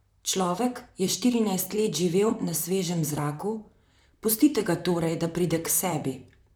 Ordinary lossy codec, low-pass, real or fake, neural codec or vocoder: none; none; fake; vocoder, 44.1 kHz, 128 mel bands, Pupu-Vocoder